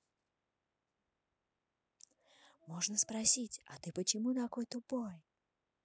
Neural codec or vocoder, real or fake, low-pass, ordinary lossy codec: none; real; none; none